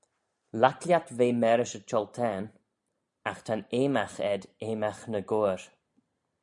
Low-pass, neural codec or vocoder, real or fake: 10.8 kHz; none; real